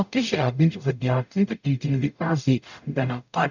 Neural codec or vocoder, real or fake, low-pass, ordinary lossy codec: codec, 44.1 kHz, 0.9 kbps, DAC; fake; 7.2 kHz; none